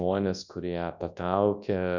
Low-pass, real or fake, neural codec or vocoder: 7.2 kHz; fake; codec, 24 kHz, 0.9 kbps, WavTokenizer, large speech release